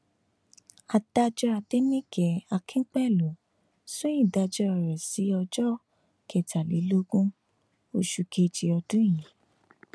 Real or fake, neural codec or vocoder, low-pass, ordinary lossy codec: real; none; none; none